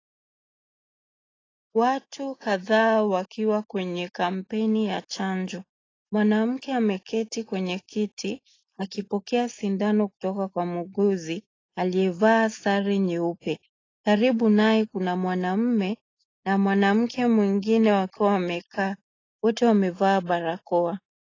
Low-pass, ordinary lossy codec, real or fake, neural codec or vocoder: 7.2 kHz; AAC, 32 kbps; real; none